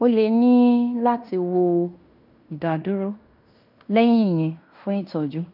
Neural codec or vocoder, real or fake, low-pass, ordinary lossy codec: codec, 16 kHz in and 24 kHz out, 0.9 kbps, LongCat-Audio-Codec, fine tuned four codebook decoder; fake; 5.4 kHz; none